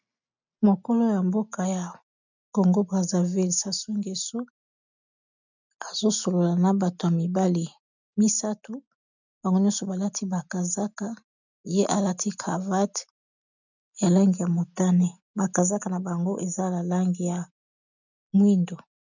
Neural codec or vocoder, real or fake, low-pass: none; real; 7.2 kHz